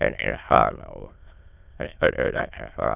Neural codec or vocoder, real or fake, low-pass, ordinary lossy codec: autoencoder, 22.05 kHz, a latent of 192 numbers a frame, VITS, trained on many speakers; fake; 3.6 kHz; none